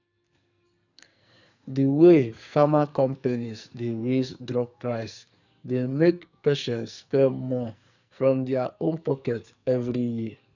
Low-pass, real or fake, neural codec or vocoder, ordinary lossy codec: 7.2 kHz; fake; codec, 44.1 kHz, 2.6 kbps, SNAC; none